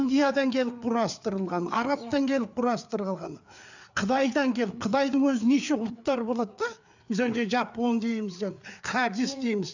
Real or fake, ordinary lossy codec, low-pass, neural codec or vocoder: fake; none; 7.2 kHz; codec, 16 kHz, 4 kbps, FreqCodec, larger model